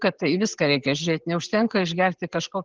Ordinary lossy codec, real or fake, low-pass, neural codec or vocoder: Opus, 16 kbps; real; 7.2 kHz; none